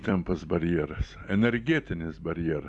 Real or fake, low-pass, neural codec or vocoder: fake; 10.8 kHz; vocoder, 44.1 kHz, 128 mel bands every 256 samples, BigVGAN v2